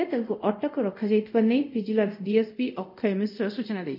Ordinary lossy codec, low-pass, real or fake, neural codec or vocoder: none; 5.4 kHz; fake; codec, 24 kHz, 0.5 kbps, DualCodec